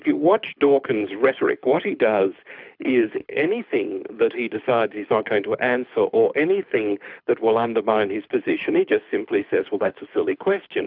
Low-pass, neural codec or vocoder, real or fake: 5.4 kHz; codec, 24 kHz, 6 kbps, HILCodec; fake